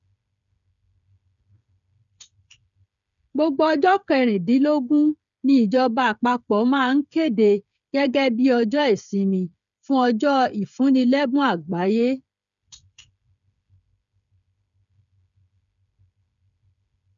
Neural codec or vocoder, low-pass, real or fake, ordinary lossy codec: codec, 16 kHz, 8 kbps, FreqCodec, smaller model; 7.2 kHz; fake; none